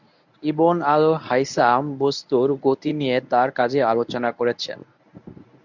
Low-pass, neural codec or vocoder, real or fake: 7.2 kHz; codec, 24 kHz, 0.9 kbps, WavTokenizer, medium speech release version 1; fake